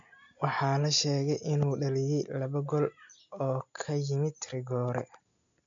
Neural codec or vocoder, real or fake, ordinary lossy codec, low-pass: none; real; AAC, 64 kbps; 7.2 kHz